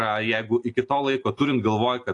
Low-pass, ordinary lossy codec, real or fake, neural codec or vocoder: 10.8 kHz; AAC, 48 kbps; real; none